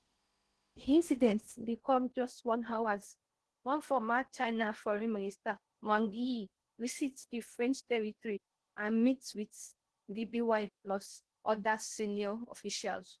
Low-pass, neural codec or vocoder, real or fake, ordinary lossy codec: 10.8 kHz; codec, 16 kHz in and 24 kHz out, 0.6 kbps, FocalCodec, streaming, 4096 codes; fake; Opus, 16 kbps